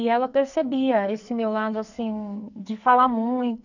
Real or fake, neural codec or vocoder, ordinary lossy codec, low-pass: fake; codec, 44.1 kHz, 2.6 kbps, SNAC; none; 7.2 kHz